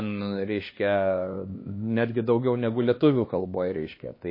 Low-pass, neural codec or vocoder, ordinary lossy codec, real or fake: 5.4 kHz; codec, 16 kHz, 2 kbps, X-Codec, HuBERT features, trained on LibriSpeech; MP3, 24 kbps; fake